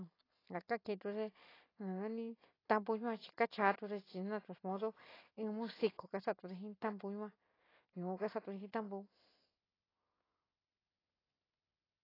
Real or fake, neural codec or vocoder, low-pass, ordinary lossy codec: real; none; 5.4 kHz; AAC, 24 kbps